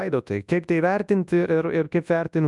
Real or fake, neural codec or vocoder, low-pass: fake; codec, 24 kHz, 0.9 kbps, WavTokenizer, large speech release; 10.8 kHz